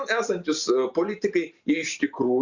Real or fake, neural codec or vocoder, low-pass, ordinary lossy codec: fake; vocoder, 44.1 kHz, 128 mel bands every 256 samples, BigVGAN v2; 7.2 kHz; Opus, 64 kbps